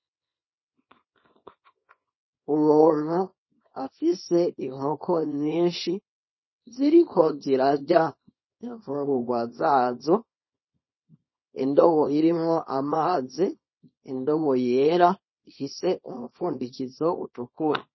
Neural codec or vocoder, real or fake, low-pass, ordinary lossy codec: codec, 24 kHz, 0.9 kbps, WavTokenizer, small release; fake; 7.2 kHz; MP3, 24 kbps